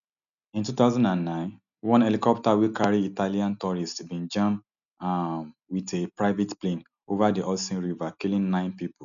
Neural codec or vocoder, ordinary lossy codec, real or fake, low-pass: none; none; real; 7.2 kHz